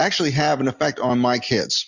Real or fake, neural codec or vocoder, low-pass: real; none; 7.2 kHz